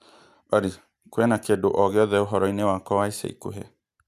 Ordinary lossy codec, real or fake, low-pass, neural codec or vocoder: none; real; 14.4 kHz; none